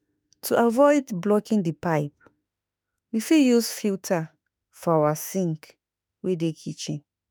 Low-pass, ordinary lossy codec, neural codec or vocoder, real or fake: none; none; autoencoder, 48 kHz, 32 numbers a frame, DAC-VAE, trained on Japanese speech; fake